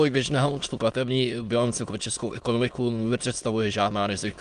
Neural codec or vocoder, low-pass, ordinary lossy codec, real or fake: autoencoder, 22.05 kHz, a latent of 192 numbers a frame, VITS, trained on many speakers; 9.9 kHz; Opus, 32 kbps; fake